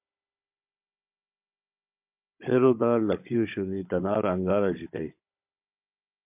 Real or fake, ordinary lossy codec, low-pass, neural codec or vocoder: fake; MP3, 32 kbps; 3.6 kHz; codec, 16 kHz, 16 kbps, FunCodec, trained on Chinese and English, 50 frames a second